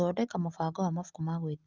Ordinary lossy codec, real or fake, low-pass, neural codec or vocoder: Opus, 32 kbps; real; 7.2 kHz; none